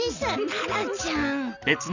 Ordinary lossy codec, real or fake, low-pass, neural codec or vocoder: none; real; 7.2 kHz; none